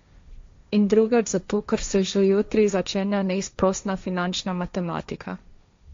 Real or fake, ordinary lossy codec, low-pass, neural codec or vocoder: fake; MP3, 48 kbps; 7.2 kHz; codec, 16 kHz, 1.1 kbps, Voila-Tokenizer